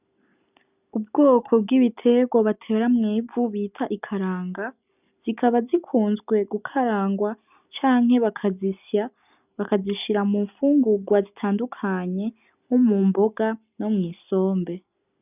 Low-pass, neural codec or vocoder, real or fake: 3.6 kHz; none; real